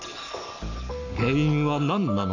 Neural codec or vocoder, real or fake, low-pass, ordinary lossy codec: codec, 44.1 kHz, 7.8 kbps, Pupu-Codec; fake; 7.2 kHz; none